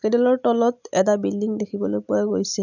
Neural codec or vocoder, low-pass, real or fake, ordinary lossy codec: none; 7.2 kHz; real; none